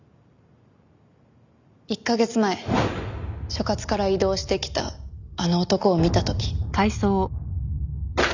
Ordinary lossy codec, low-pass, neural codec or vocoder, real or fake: none; 7.2 kHz; none; real